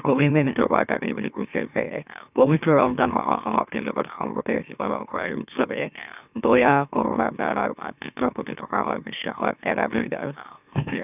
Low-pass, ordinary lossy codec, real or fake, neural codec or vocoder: 3.6 kHz; none; fake; autoencoder, 44.1 kHz, a latent of 192 numbers a frame, MeloTTS